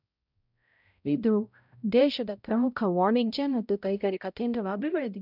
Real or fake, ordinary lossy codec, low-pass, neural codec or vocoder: fake; none; 5.4 kHz; codec, 16 kHz, 0.5 kbps, X-Codec, HuBERT features, trained on balanced general audio